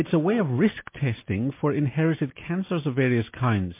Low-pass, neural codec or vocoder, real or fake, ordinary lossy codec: 3.6 kHz; none; real; MP3, 24 kbps